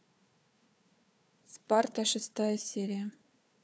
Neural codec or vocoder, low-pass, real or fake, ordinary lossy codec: codec, 16 kHz, 4 kbps, FunCodec, trained on Chinese and English, 50 frames a second; none; fake; none